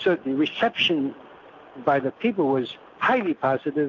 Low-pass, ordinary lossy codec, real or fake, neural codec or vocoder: 7.2 kHz; AAC, 48 kbps; real; none